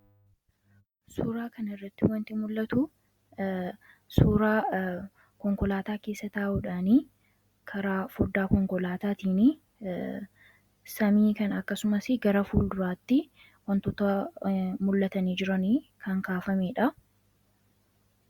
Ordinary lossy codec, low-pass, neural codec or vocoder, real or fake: Opus, 64 kbps; 19.8 kHz; none; real